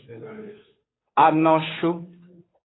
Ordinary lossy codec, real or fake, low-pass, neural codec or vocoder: AAC, 16 kbps; fake; 7.2 kHz; codec, 16 kHz, 2 kbps, FunCodec, trained on Chinese and English, 25 frames a second